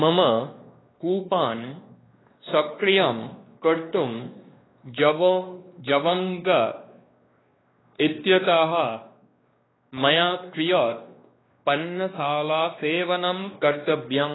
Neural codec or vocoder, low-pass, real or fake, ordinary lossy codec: codec, 16 kHz, 2 kbps, X-Codec, WavLM features, trained on Multilingual LibriSpeech; 7.2 kHz; fake; AAC, 16 kbps